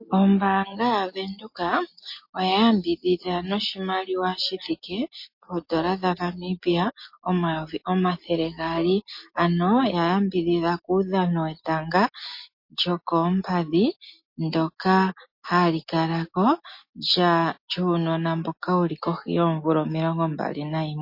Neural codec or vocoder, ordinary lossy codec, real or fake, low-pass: none; MP3, 32 kbps; real; 5.4 kHz